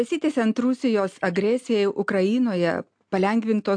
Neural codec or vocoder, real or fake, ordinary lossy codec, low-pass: none; real; AAC, 64 kbps; 9.9 kHz